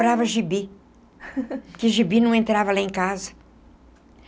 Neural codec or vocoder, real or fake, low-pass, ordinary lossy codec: none; real; none; none